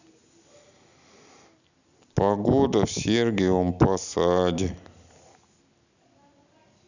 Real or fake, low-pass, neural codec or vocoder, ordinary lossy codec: real; 7.2 kHz; none; none